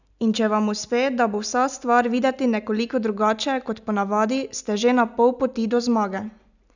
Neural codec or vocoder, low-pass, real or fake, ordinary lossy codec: none; 7.2 kHz; real; none